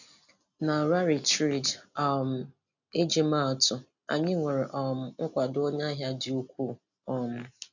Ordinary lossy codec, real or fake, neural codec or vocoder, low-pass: none; real; none; 7.2 kHz